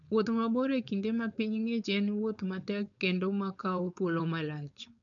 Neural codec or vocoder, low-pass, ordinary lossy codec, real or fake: codec, 16 kHz, 4.8 kbps, FACodec; 7.2 kHz; none; fake